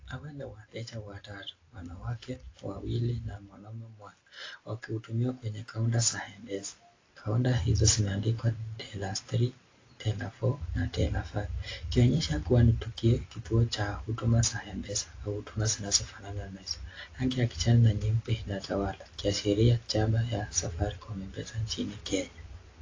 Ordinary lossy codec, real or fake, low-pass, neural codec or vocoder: AAC, 32 kbps; real; 7.2 kHz; none